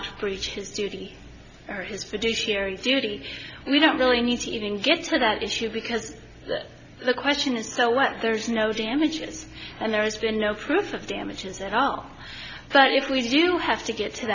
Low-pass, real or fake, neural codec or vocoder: 7.2 kHz; real; none